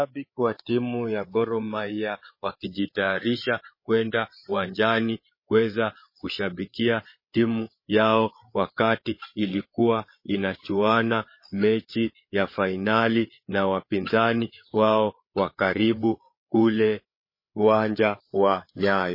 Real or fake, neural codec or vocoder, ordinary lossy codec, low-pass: fake; codec, 16 kHz, 8 kbps, FreqCodec, larger model; MP3, 24 kbps; 5.4 kHz